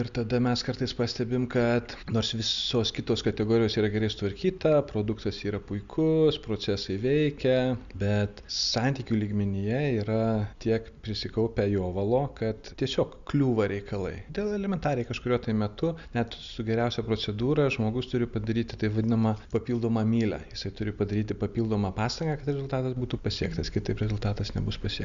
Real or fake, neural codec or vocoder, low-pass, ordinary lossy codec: real; none; 7.2 kHz; Opus, 64 kbps